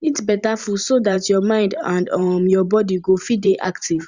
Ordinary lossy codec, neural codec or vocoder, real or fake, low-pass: Opus, 64 kbps; vocoder, 44.1 kHz, 128 mel bands every 512 samples, BigVGAN v2; fake; 7.2 kHz